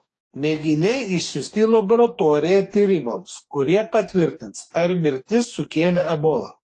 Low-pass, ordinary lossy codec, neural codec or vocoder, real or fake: 10.8 kHz; AAC, 48 kbps; codec, 44.1 kHz, 2.6 kbps, DAC; fake